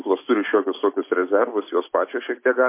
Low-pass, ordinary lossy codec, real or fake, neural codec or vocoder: 3.6 kHz; MP3, 24 kbps; real; none